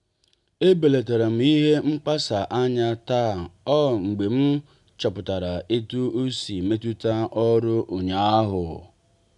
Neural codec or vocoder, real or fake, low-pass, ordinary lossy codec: none; real; 10.8 kHz; none